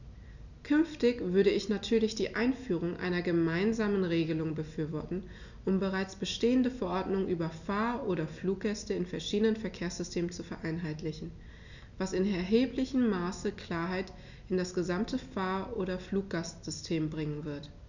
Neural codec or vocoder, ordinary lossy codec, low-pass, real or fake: none; none; 7.2 kHz; real